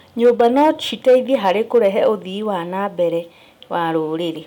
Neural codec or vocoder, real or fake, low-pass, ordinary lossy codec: none; real; 19.8 kHz; none